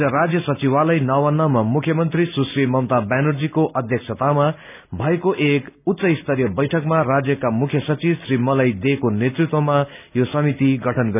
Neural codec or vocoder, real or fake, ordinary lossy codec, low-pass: none; real; none; 3.6 kHz